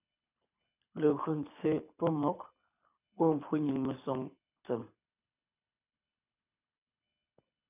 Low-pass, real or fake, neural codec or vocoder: 3.6 kHz; fake; codec, 24 kHz, 6 kbps, HILCodec